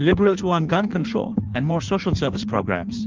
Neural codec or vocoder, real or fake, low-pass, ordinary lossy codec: codec, 16 kHz in and 24 kHz out, 1.1 kbps, FireRedTTS-2 codec; fake; 7.2 kHz; Opus, 24 kbps